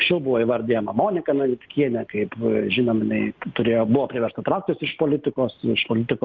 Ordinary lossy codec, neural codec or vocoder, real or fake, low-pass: Opus, 24 kbps; none; real; 7.2 kHz